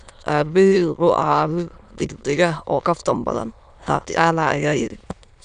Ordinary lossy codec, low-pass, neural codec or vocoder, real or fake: none; 9.9 kHz; autoencoder, 22.05 kHz, a latent of 192 numbers a frame, VITS, trained on many speakers; fake